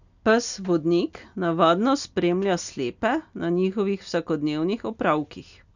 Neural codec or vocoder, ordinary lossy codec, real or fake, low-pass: none; none; real; 7.2 kHz